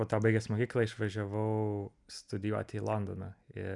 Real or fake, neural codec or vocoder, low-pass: real; none; 10.8 kHz